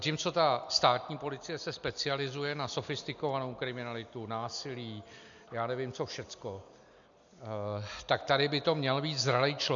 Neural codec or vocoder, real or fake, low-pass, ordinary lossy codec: none; real; 7.2 kHz; MP3, 96 kbps